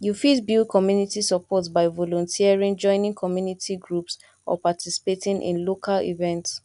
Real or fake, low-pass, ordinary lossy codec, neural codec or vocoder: real; 10.8 kHz; none; none